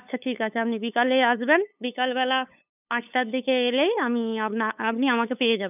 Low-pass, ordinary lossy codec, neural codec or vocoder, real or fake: 3.6 kHz; none; codec, 16 kHz, 8 kbps, FunCodec, trained on LibriTTS, 25 frames a second; fake